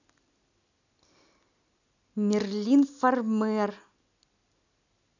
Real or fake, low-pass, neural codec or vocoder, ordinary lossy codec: real; 7.2 kHz; none; none